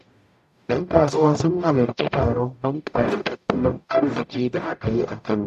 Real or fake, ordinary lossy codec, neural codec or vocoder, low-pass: fake; none; codec, 44.1 kHz, 0.9 kbps, DAC; 14.4 kHz